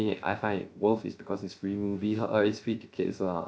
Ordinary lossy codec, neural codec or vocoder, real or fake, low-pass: none; codec, 16 kHz, 0.3 kbps, FocalCodec; fake; none